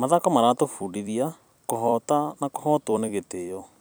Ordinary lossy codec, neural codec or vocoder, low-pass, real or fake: none; vocoder, 44.1 kHz, 128 mel bands every 256 samples, BigVGAN v2; none; fake